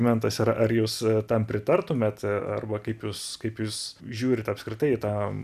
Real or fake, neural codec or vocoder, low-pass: real; none; 14.4 kHz